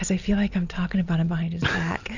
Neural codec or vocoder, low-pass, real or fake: none; 7.2 kHz; real